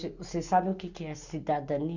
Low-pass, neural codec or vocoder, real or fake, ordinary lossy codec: 7.2 kHz; none; real; none